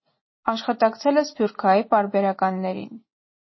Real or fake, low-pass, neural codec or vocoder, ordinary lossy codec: real; 7.2 kHz; none; MP3, 24 kbps